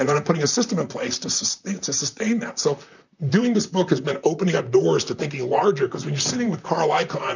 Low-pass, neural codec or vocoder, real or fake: 7.2 kHz; vocoder, 44.1 kHz, 128 mel bands, Pupu-Vocoder; fake